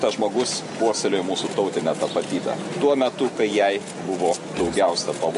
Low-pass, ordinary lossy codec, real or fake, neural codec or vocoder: 10.8 kHz; MP3, 48 kbps; real; none